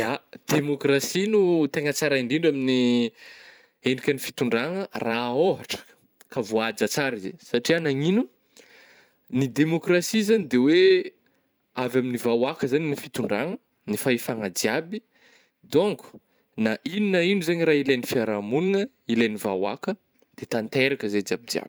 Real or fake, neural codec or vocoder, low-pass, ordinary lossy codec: fake; vocoder, 44.1 kHz, 128 mel bands every 512 samples, BigVGAN v2; none; none